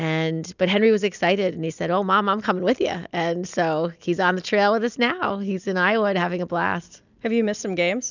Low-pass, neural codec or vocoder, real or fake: 7.2 kHz; none; real